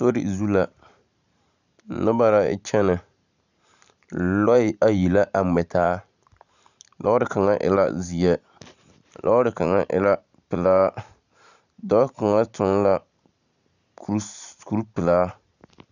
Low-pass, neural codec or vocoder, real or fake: 7.2 kHz; vocoder, 44.1 kHz, 128 mel bands every 512 samples, BigVGAN v2; fake